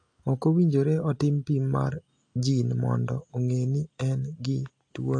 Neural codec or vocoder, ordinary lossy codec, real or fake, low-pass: none; AAC, 48 kbps; real; 9.9 kHz